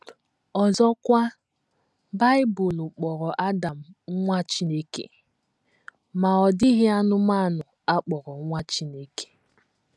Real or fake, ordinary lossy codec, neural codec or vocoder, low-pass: real; none; none; none